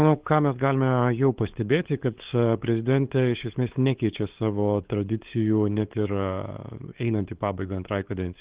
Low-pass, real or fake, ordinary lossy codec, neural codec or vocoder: 3.6 kHz; fake; Opus, 16 kbps; codec, 16 kHz, 8 kbps, FunCodec, trained on LibriTTS, 25 frames a second